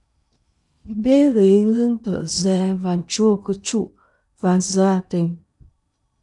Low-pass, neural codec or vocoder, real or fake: 10.8 kHz; codec, 16 kHz in and 24 kHz out, 0.8 kbps, FocalCodec, streaming, 65536 codes; fake